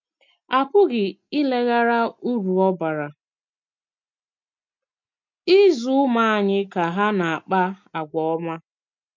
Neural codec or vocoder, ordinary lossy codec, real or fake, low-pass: none; MP3, 48 kbps; real; 7.2 kHz